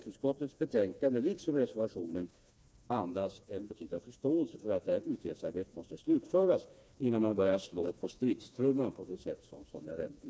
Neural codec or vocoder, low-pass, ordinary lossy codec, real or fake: codec, 16 kHz, 2 kbps, FreqCodec, smaller model; none; none; fake